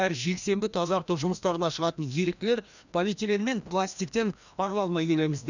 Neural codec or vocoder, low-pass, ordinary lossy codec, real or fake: codec, 16 kHz, 1 kbps, FreqCodec, larger model; 7.2 kHz; none; fake